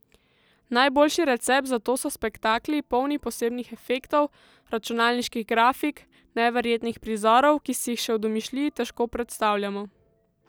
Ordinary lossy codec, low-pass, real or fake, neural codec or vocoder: none; none; real; none